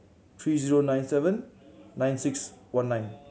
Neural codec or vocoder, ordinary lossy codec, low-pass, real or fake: none; none; none; real